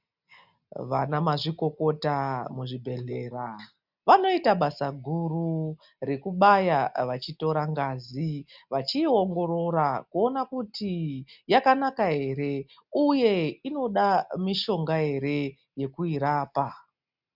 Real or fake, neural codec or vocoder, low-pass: real; none; 5.4 kHz